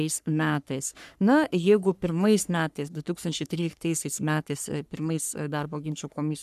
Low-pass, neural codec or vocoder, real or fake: 14.4 kHz; codec, 44.1 kHz, 3.4 kbps, Pupu-Codec; fake